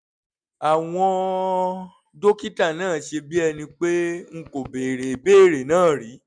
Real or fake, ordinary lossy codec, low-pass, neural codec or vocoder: real; MP3, 96 kbps; 9.9 kHz; none